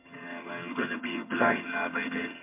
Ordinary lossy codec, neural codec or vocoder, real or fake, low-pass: MP3, 24 kbps; vocoder, 22.05 kHz, 80 mel bands, HiFi-GAN; fake; 3.6 kHz